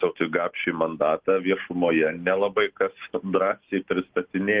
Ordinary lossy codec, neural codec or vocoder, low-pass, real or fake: Opus, 16 kbps; none; 3.6 kHz; real